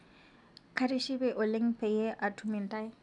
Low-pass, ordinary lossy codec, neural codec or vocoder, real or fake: 10.8 kHz; none; none; real